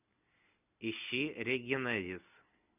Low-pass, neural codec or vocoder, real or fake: 3.6 kHz; none; real